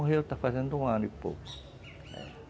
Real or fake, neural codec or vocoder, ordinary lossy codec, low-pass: real; none; none; none